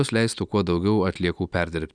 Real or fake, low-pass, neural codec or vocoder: real; 9.9 kHz; none